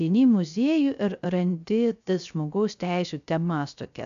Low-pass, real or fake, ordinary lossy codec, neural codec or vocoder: 7.2 kHz; fake; AAC, 96 kbps; codec, 16 kHz, 0.3 kbps, FocalCodec